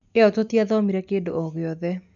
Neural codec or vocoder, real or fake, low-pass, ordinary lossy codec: none; real; 7.2 kHz; MP3, 96 kbps